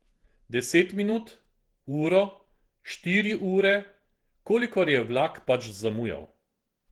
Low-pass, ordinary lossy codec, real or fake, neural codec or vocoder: 19.8 kHz; Opus, 16 kbps; fake; vocoder, 48 kHz, 128 mel bands, Vocos